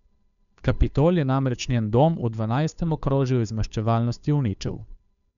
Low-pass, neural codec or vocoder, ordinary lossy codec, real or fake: 7.2 kHz; codec, 16 kHz, 2 kbps, FunCodec, trained on Chinese and English, 25 frames a second; none; fake